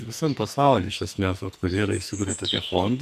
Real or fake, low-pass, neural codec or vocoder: fake; 14.4 kHz; codec, 44.1 kHz, 2.6 kbps, SNAC